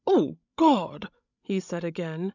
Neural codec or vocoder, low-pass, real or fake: codec, 16 kHz, 16 kbps, FreqCodec, larger model; 7.2 kHz; fake